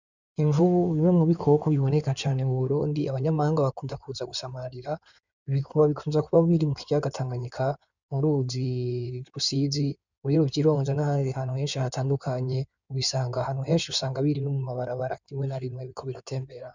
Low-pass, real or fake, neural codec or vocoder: 7.2 kHz; fake; codec, 16 kHz in and 24 kHz out, 2.2 kbps, FireRedTTS-2 codec